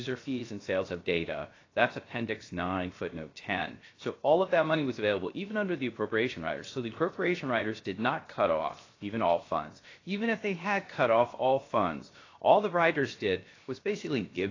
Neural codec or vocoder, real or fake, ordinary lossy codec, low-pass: codec, 16 kHz, 0.7 kbps, FocalCodec; fake; AAC, 32 kbps; 7.2 kHz